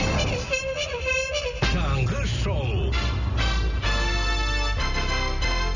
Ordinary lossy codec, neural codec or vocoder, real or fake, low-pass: none; none; real; 7.2 kHz